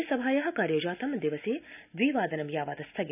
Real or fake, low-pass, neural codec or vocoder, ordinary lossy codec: real; 3.6 kHz; none; none